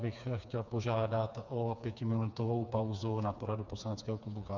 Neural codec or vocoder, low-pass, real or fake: codec, 16 kHz, 4 kbps, FreqCodec, smaller model; 7.2 kHz; fake